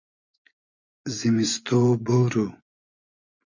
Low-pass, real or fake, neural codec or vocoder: 7.2 kHz; fake; vocoder, 24 kHz, 100 mel bands, Vocos